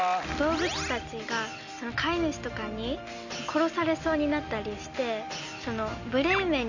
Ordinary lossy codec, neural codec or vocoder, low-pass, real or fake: none; none; 7.2 kHz; real